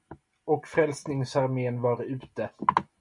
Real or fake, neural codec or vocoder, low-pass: real; none; 10.8 kHz